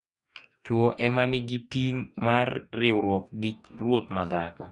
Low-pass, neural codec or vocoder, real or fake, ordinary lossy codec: 10.8 kHz; codec, 44.1 kHz, 2.6 kbps, DAC; fake; none